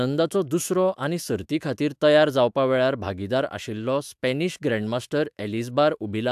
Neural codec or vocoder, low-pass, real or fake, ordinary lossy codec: autoencoder, 48 kHz, 128 numbers a frame, DAC-VAE, trained on Japanese speech; 14.4 kHz; fake; AAC, 96 kbps